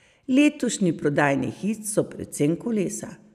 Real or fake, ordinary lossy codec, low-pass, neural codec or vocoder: real; none; 14.4 kHz; none